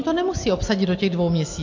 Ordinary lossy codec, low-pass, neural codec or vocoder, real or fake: AAC, 48 kbps; 7.2 kHz; none; real